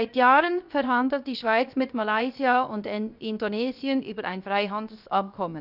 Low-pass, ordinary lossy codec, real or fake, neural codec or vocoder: 5.4 kHz; none; fake; codec, 16 kHz, 0.7 kbps, FocalCodec